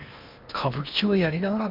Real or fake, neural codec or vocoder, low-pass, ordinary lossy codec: fake; codec, 16 kHz in and 24 kHz out, 0.8 kbps, FocalCodec, streaming, 65536 codes; 5.4 kHz; MP3, 48 kbps